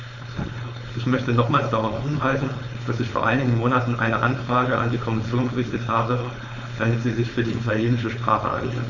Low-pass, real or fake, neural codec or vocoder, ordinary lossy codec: 7.2 kHz; fake; codec, 16 kHz, 4.8 kbps, FACodec; none